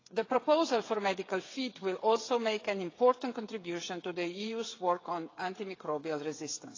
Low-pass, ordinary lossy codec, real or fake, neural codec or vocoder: 7.2 kHz; AAC, 32 kbps; fake; codec, 16 kHz, 8 kbps, FreqCodec, smaller model